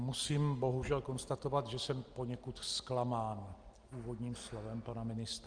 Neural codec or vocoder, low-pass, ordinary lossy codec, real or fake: none; 9.9 kHz; Opus, 24 kbps; real